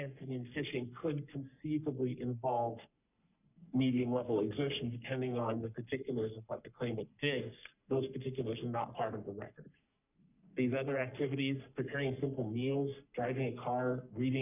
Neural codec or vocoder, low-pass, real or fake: codec, 44.1 kHz, 3.4 kbps, Pupu-Codec; 3.6 kHz; fake